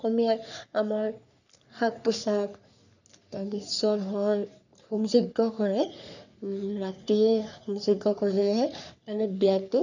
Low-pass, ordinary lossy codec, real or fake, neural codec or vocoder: 7.2 kHz; none; fake; codec, 44.1 kHz, 3.4 kbps, Pupu-Codec